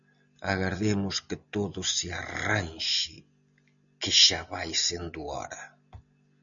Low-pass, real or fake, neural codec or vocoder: 7.2 kHz; real; none